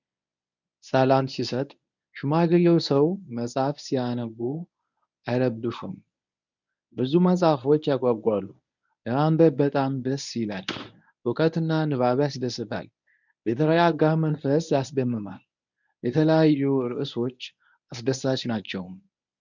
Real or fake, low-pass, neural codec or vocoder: fake; 7.2 kHz; codec, 24 kHz, 0.9 kbps, WavTokenizer, medium speech release version 1